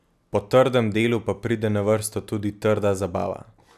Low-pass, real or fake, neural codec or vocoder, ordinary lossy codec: 14.4 kHz; real; none; none